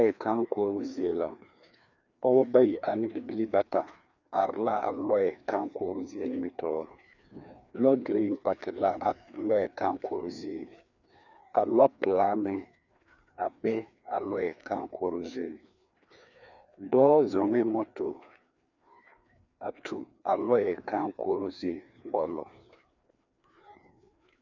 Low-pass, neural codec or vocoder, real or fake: 7.2 kHz; codec, 16 kHz, 2 kbps, FreqCodec, larger model; fake